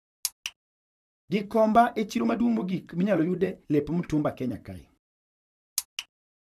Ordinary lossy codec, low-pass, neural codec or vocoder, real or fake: none; 14.4 kHz; vocoder, 44.1 kHz, 128 mel bands, Pupu-Vocoder; fake